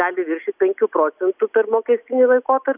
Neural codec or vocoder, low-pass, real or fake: none; 3.6 kHz; real